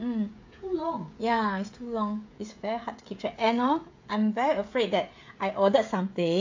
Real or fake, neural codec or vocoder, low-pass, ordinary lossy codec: fake; codec, 16 kHz, 16 kbps, FreqCodec, smaller model; 7.2 kHz; AAC, 48 kbps